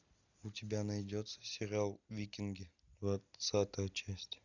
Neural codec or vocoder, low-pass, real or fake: none; 7.2 kHz; real